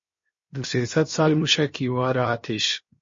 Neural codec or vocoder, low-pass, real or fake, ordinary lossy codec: codec, 16 kHz, 0.7 kbps, FocalCodec; 7.2 kHz; fake; MP3, 32 kbps